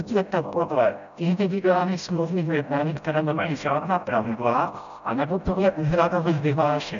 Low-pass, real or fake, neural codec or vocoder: 7.2 kHz; fake; codec, 16 kHz, 0.5 kbps, FreqCodec, smaller model